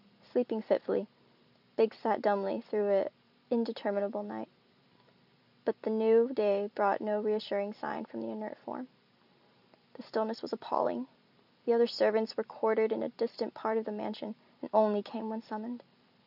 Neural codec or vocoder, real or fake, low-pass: vocoder, 44.1 kHz, 128 mel bands every 256 samples, BigVGAN v2; fake; 5.4 kHz